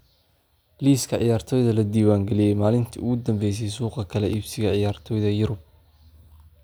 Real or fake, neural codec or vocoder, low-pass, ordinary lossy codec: real; none; none; none